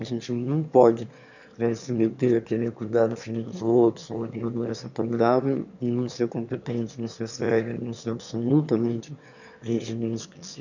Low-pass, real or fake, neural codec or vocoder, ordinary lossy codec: 7.2 kHz; fake; autoencoder, 22.05 kHz, a latent of 192 numbers a frame, VITS, trained on one speaker; none